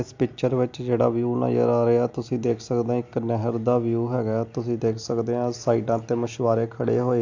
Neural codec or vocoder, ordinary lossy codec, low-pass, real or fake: none; AAC, 48 kbps; 7.2 kHz; real